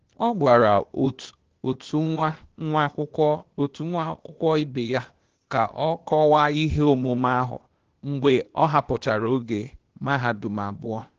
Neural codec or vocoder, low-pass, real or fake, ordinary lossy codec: codec, 16 kHz, 0.8 kbps, ZipCodec; 7.2 kHz; fake; Opus, 16 kbps